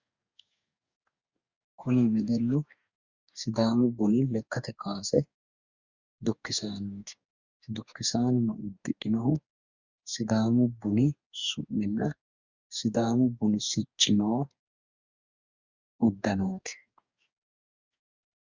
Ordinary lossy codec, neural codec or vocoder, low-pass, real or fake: Opus, 64 kbps; codec, 44.1 kHz, 2.6 kbps, DAC; 7.2 kHz; fake